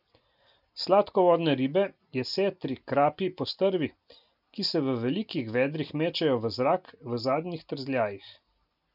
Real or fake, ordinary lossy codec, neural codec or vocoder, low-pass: real; none; none; 5.4 kHz